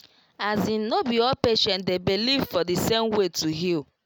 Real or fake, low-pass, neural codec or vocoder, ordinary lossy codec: real; none; none; none